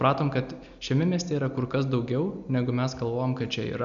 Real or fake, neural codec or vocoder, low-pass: real; none; 7.2 kHz